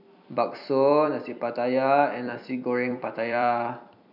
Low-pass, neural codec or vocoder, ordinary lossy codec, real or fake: 5.4 kHz; vocoder, 44.1 kHz, 128 mel bands every 256 samples, BigVGAN v2; none; fake